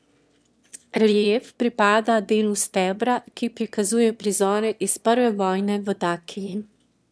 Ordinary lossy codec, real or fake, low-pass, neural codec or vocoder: none; fake; none; autoencoder, 22.05 kHz, a latent of 192 numbers a frame, VITS, trained on one speaker